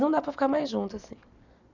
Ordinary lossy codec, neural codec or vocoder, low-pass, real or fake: none; none; 7.2 kHz; real